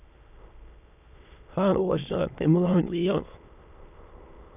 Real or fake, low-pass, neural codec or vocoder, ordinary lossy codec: fake; 3.6 kHz; autoencoder, 22.05 kHz, a latent of 192 numbers a frame, VITS, trained on many speakers; none